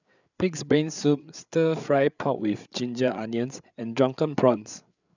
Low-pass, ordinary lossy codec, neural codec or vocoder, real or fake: 7.2 kHz; none; codec, 16 kHz, 8 kbps, FreqCodec, larger model; fake